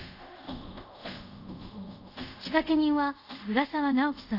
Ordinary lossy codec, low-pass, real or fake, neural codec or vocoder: Opus, 64 kbps; 5.4 kHz; fake; codec, 24 kHz, 0.5 kbps, DualCodec